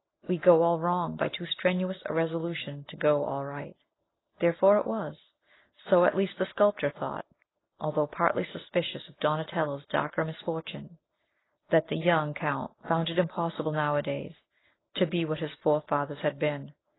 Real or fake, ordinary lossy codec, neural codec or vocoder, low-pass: real; AAC, 16 kbps; none; 7.2 kHz